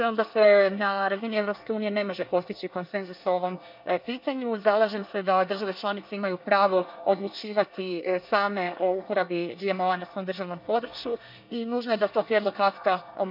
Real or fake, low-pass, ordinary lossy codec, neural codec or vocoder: fake; 5.4 kHz; none; codec, 24 kHz, 1 kbps, SNAC